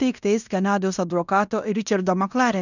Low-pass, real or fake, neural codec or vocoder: 7.2 kHz; fake; codec, 16 kHz in and 24 kHz out, 0.9 kbps, LongCat-Audio-Codec, fine tuned four codebook decoder